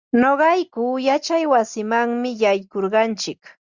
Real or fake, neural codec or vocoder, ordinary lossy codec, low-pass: real; none; Opus, 64 kbps; 7.2 kHz